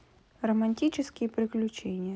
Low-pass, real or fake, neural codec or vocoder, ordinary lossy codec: none; real; none; none